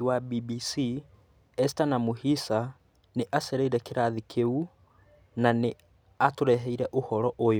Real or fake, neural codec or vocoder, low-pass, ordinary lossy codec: real; none; none; none